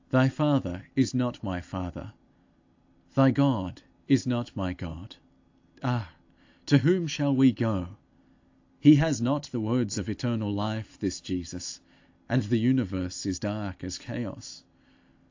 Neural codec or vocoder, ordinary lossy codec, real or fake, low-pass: none; AAC, 48 kbps; real; 7.2 kHz